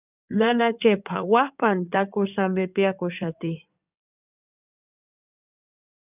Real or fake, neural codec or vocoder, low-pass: fake; codec, 16 kHz in and 24 kHz out, 2.2 kbps, FireRedTTS-2 codec; 3.6 kHz